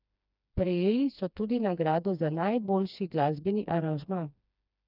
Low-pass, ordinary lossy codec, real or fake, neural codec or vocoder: 5.4 kHz; none; fake; codec, 16 kHz, 2 kbps, FreqCodec, smaller model